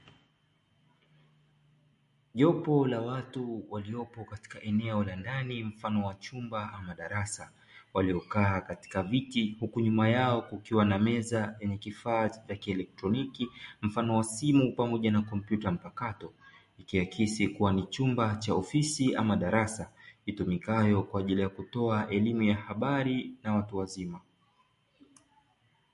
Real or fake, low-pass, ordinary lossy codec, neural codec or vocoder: real; 14.4 kHz; MP3, 48 kbps; none